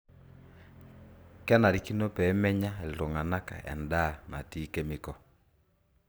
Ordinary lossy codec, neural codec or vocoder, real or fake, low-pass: none; none; real; none